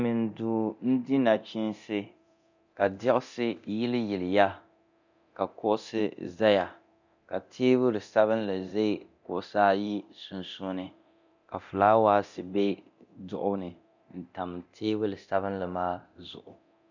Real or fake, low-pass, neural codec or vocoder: fake; 7.2 kHz; codec, 24 kHz, 0.9 kbps, DualCodec